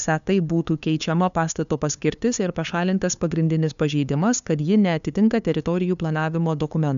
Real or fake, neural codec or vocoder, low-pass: fake; codec, 16 kHz, 2 kbps, FunCodec, trained on LibriTTS, 25 frames a second; 7.2 kHz